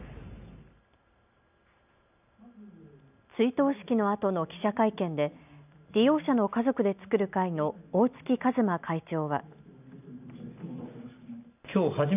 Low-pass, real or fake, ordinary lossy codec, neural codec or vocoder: 3.6 kHz; real; none; none